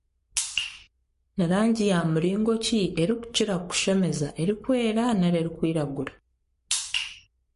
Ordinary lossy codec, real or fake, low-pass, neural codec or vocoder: MP3, 48 kbps; fake; 14.4 kHz; codec, 44.1 kHz, 7.8 kbps, Pupu-Codec